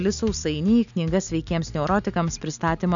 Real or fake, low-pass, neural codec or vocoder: real; 7.2 kHz; none